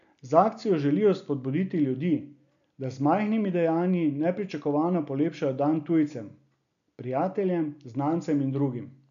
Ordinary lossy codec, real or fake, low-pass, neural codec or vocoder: none; real; 7.2 kHz; none